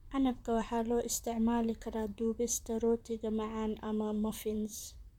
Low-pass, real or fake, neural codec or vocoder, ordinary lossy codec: 19.8 kHz; fake; vocoder, 44.1 kHz, 128 mel bands, Pupu-Vocoder; none